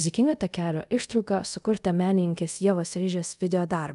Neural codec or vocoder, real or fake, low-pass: codec, 24 kHz, 0.5 kbps, DualCodec; fake; 10.8 kHz